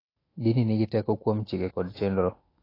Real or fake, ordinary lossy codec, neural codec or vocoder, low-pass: fake; AAC, 24 kbps; autoencoder, 48 kHz, 128 numbers a frame, DAC-VAE, trained on Japanese speech; 5.4 kHz